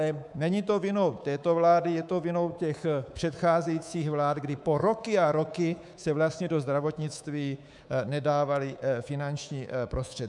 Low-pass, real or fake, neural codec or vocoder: 10.8 kHz; fake; codec, 24 kHz, 3.1 kbps, DualCodec